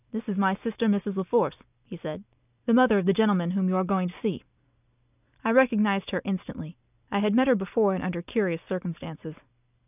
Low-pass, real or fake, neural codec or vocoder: 3.6 kHz; real; none